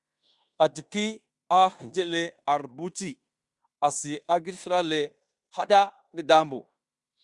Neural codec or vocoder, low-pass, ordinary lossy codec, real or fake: codec, 16 kHz in and 24 kHz out, 0.9 kbps, LongCat-Audio-Codec, fine tuned four codebook decoder; 10.8 kHz; Opus, 64 kbps; fake